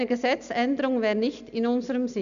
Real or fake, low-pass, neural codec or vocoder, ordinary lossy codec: real; 7.2 kHz; none; none